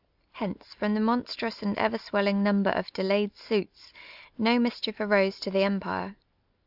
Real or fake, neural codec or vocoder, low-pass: real; none; 5.4 kHz